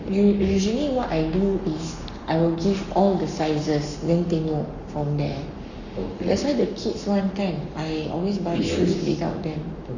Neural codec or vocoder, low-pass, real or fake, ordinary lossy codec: codec, 44.1 kHz, 7.8 kbps, Pupu-Codec; 7.2 kHz; fake; none